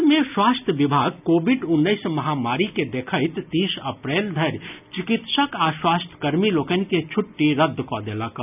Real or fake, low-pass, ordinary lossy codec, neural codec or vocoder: real; 3.6 kHz; none; none